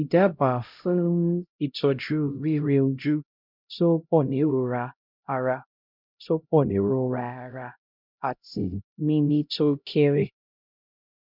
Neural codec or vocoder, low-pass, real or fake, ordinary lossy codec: codec, 16 kHz, 0.5 kbps, X-Codec, HuBERT features, trained on LibriSpeech; 5.4 kHz; fake; none